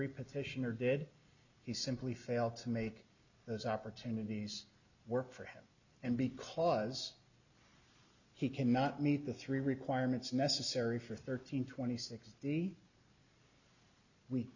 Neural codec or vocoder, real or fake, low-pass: none; real; 7.2 kHz